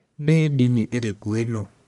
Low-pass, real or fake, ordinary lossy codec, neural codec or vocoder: 10.8 kHz; fake; none; codec, 44.1 kHz, 1.7 kbps, Pupu-Codec